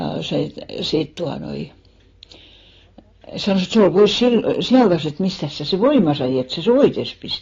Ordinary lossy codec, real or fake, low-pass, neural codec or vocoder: AAC, 32 kbps; real; 7.2 kHz; none